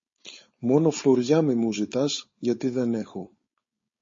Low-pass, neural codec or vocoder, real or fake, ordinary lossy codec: 7.2 kHz; codec, 16 kHz, 4.8 kbps, FACodec; fake; MP3, 32 kbps